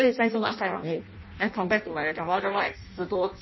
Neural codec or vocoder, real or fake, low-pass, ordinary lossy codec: codec, 16 kHz in and 24 kHz out, 0.6 kbps, FireRedTTS-2 codec; fake; 7.2 kHz; MP3, 24 kbps